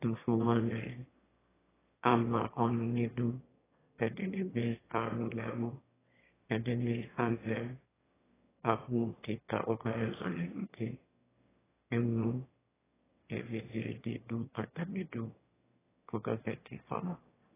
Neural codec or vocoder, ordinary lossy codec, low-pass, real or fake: autoencoder, 22.05 kHz, a latent of 192 numbers a frame, VITS, trained on one speaker; AAC, 16 kbps; 3.6 kHz; fake